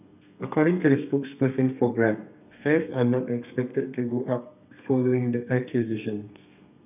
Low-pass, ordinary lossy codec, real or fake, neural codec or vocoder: 3.6 kHz; none; fake; codec, 32 kHz, 1.9 kbps, SNAC